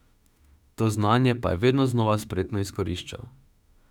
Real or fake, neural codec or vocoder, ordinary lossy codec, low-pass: fake; autoencoder, 48 kHz, 32 numbers a frame, DAC-VAE, trained on Japanese speech; none; 19.8 kHz